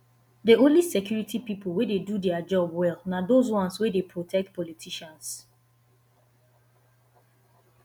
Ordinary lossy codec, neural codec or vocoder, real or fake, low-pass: none; none; real; none